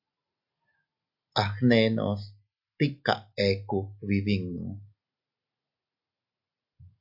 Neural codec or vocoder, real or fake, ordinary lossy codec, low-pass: none; real; AAC, 48 kbps; 5.4 kHz